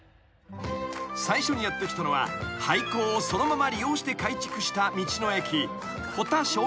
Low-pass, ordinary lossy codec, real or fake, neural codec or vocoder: none; none; real; none